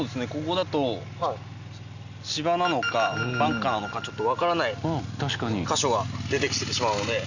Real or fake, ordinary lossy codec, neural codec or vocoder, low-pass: real; none; none; 7.2 kHz